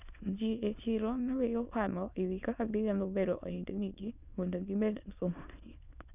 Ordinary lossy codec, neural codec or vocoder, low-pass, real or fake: none; autoencoder, 22.05 kHz, a latent of 192 numbers a frame, VITS, trained on many speakers; 3.6 kHz; fake